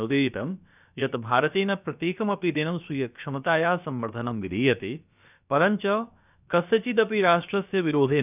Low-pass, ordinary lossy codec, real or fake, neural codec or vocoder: 3.6 kHz; none; fake; codec, 16 kHz, about 1 kbps, DyCAST, with the encoder's durations